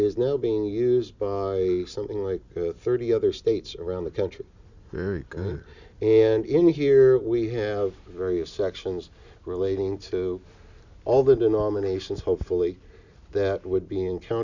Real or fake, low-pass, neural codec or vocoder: real; 7.2 kHz; none